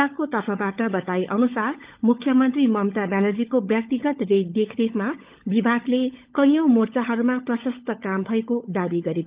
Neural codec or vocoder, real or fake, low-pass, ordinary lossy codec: codec, 16 kHz, 16 kbps, FunCodec, trained on LibriTTS, 50 frames a second; fake; 3.6 kHz; Opus, 32 kbps